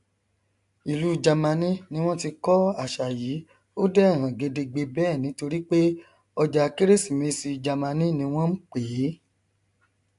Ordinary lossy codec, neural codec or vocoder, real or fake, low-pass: AAC, 64 kbps; none; real; 10.8 kHz